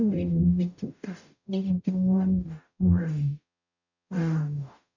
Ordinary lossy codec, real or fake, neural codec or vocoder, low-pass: none; fake; codec, 44.1 kHz, 0.9 kbps, DAC; 7.2 kHz